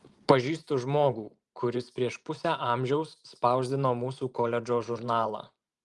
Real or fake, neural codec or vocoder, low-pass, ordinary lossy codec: fake; vocoder, 44.1 kHz, 128 mel bands every 512 samples, BigVGAN v2; 10.8 kHz; Opus, 24 kbps